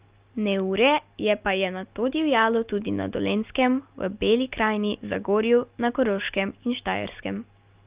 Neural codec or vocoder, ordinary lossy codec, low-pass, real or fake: none; Opus, 32 kbps; 3.6 kHz; real